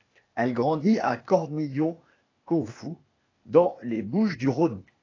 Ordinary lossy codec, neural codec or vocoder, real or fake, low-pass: AAC, 32 kbps; codec, 16 kHz, 0.8 kbps, ZipCodec; fake; 7.2 kHz